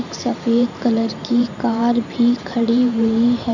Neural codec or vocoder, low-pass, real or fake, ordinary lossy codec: vocoder, 44.1 kHz, 128 mel bands every 512 samples, BigVGAN v2; 7.2 kHz; fake; none